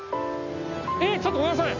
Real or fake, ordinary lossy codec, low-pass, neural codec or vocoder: real; none; 7.2 kHz; none